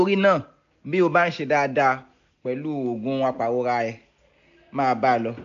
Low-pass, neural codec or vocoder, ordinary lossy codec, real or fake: 7.2 kHz; none; AAC, 64 kbps; real